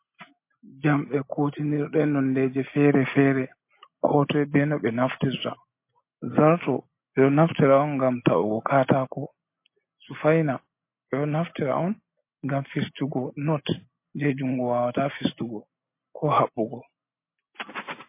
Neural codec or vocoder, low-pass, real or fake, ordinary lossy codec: none; 3.6 kHz; real; MP3, 24 kbps